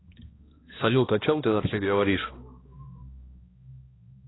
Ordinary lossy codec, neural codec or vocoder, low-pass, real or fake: AAC, 16 kbps; codec, 16 kHz, 1 kbps, X-Codec, HuBERT features, trained on balanced general audio; 7.2 kHz; fake